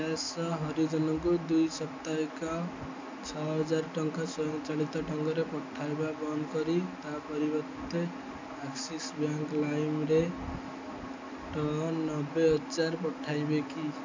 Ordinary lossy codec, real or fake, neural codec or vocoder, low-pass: MP3, 64 kbps; real; none; 7.2 kHz